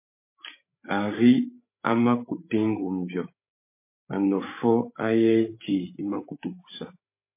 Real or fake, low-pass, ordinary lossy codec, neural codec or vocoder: fake; 3.6 kHz; MP3, 24 kbps; codec, 16 kHz, 8 kbps, FreqCodec, larger model